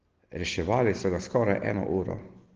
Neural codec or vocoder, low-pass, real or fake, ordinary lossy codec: none; 7.2 kHz; real; Opus, 16 kbps